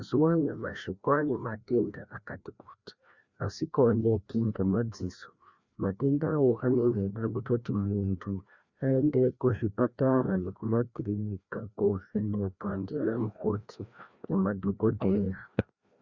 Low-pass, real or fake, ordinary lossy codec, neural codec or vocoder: 7.2 kHz; fake; Opus, 64 kbps; codec, 16 kHz, 1 kbps, FreqCodec, larger model